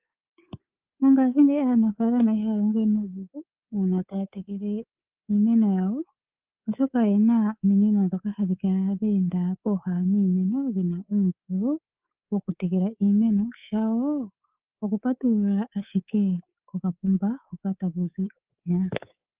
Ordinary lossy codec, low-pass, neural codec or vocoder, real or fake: Opus, 32 kbps; 3.6 kHz; codec, 24 kHz, 3.1 kbps, DualCodec; fake